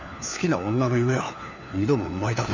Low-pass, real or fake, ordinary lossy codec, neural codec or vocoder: 7.2 kHz; fake; none; codec, 16 kHz, 4 kbps, FunCodec, trained on LibriTTS, 50 frames a second